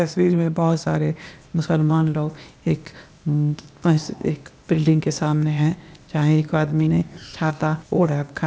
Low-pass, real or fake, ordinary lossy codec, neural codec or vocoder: none; fake; none; codec, 16 kHz, 0.8 kbps, ZipCodec